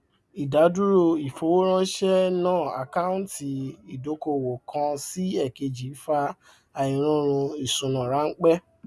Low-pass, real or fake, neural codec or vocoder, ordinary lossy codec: none; real; none; none